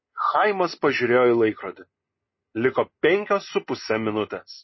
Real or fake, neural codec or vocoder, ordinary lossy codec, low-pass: real; none; MP3, 24 kbps; 7.2 kHz